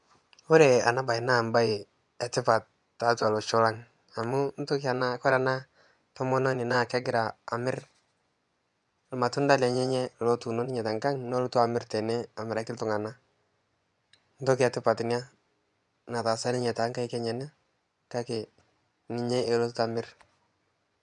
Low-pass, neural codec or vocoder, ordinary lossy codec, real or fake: 10.8 kHz; vocoder, 24 kHz, 100 mel bands, Vocos; none; fake